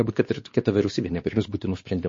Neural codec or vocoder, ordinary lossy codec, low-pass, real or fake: codec, 16 kHz, 2 kbps, X-Codec, WavLM features, trained on Multilingual LibriSpeech; MP3, 32 kbps; 7.2 kHz; fake